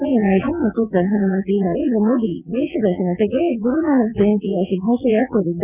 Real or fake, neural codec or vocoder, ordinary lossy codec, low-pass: fake; vocoder, 22.05 kHz, 80 mel bands, WaveNeXt; none; 3.6 kHz